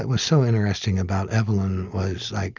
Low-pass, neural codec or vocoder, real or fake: 7.2 kHz; none; real